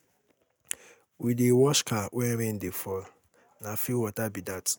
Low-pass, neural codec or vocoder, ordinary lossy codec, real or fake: none; none; none; real